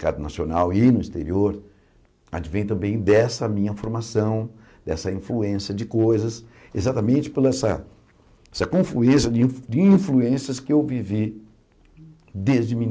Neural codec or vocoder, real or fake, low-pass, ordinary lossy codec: none; real; none; none